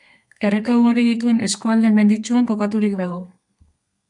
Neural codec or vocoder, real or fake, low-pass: codec, 32 kHz, 1.9 kbps, SNAC; fake; 10.8 kHz